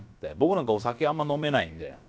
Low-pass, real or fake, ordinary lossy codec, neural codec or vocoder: none; fake; none; codec, 16 kHz, about 1 kbps, DyCAST, with the encoder's durations